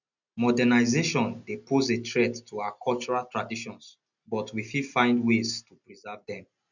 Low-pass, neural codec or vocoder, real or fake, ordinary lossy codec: 7.2 kHz; none; real; none